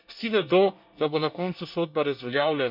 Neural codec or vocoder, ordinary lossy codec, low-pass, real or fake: codec, 24 kHz, 1 kbps, SNAC; none; 5.4 kHz; fake